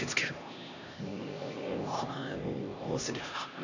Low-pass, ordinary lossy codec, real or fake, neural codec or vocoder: 7.2 kHz; none; fake; codec, 16 kHz, 1 kbps, X-Codec, HuBERT features, trained on LibriSpeech